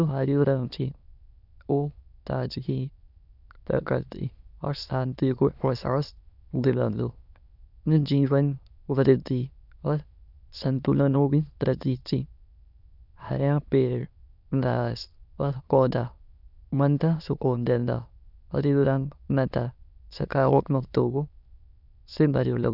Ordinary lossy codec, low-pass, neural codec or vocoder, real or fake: none; 5.4 kHz; autoencoder, 22.05 kHz, a latent of 192 numbers a frame, VITS, trained on many speakers; fake